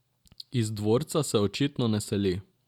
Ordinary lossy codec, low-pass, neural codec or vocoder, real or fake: none; 19.8 kHz; none; real